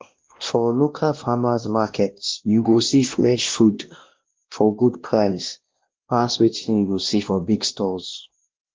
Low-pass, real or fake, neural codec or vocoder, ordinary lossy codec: 7.2 kHz; fake; codec, 16 kHz, 1 kbps, X-Codec, WavLM features, trained on Multilingual LibriSpeech; Opus, 16 kbps